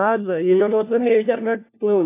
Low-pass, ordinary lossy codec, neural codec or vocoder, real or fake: 3.6 kHz; AAC, 24 kbps; codec, 16 kHz, 1 kbps, FunCodec, trained on LibriTTS, 50 frames a second; fake